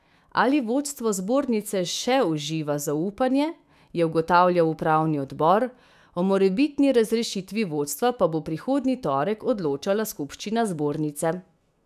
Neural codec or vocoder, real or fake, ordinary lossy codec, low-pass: autoencoder, 48 kHz, 128 numbers a frame, DAC-VAE, trained on Japanese speech; fake; none; 14.4 kHz